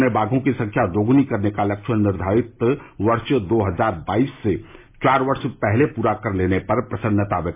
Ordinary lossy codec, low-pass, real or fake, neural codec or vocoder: MP3, 24 kbps; 3.6 kHz; real; none